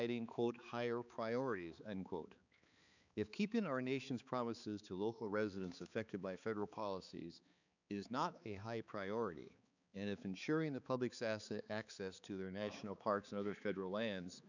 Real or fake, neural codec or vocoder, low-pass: fake; codec, 16 kHz, 4 kbps, X-Codec, HuBERT features, trained on balanced general audio; 7.2 kHz